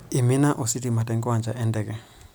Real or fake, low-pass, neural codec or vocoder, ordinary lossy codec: real; none; none; none